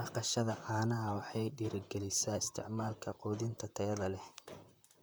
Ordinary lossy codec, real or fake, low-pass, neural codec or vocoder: none; fake; none; vocoder, 44.1 kHz, 128 mel bands, Pupu-Vocoder